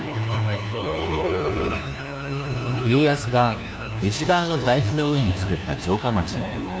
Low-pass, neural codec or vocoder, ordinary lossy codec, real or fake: none; codec, 16 kHz, 1 kbps, FunCodec, trained on LibriTTS, 50 frames a second; none; fake